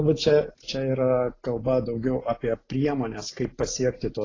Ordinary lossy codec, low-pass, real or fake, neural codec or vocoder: AAC, 32 kbps; 7.2 kHz; real; none